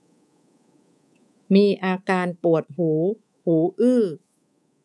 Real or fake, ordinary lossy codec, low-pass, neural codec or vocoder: fake; none; none; codec, 24 kHz, 3.1 kbps, DualCodec